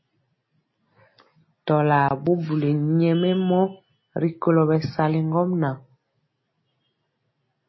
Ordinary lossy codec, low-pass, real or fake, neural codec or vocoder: MP3, 24 kbps; 7.2 kHz; real; none